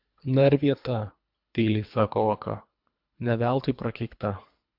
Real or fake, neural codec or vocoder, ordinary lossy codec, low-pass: fake; codec, 24 kHz, 3 kbps, HILCodec; MP3, 48 kbps; 5.4 kHz